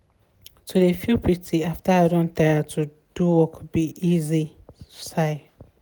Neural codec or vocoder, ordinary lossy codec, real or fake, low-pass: none; none; real; none